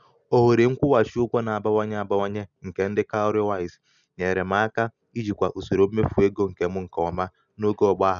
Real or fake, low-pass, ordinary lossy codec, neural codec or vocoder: real; 7.2 kHz; none; none